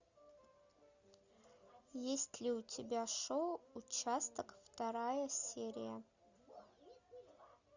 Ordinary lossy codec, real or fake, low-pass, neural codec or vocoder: Opus, 64 kbps; real; 7.2 kHz; none